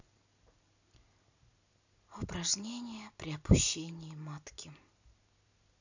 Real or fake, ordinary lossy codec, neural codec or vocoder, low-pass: real; none; none; 7.2 kHz